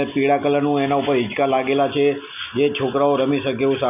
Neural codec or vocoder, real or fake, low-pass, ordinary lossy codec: none; real; 3.6 kHz; none